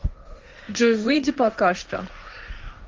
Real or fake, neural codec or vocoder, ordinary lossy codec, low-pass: fake; codec, 16 kHz, 1.1 kbps, Voila-Tokenizer; Opus, 32 kbps; 7.2 kHz